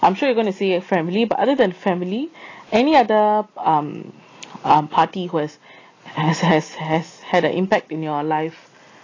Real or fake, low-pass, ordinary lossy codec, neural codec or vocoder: real; 7.2 kHz; AAC, 32 kbps; none